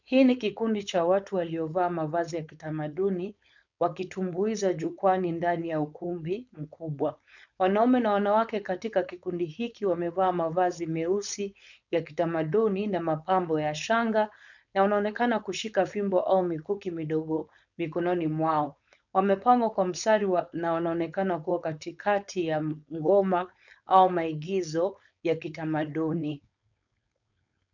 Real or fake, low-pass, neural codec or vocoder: fake; 7.2 kHz; codec, 16 kHz, 4.8 kbps, FACodec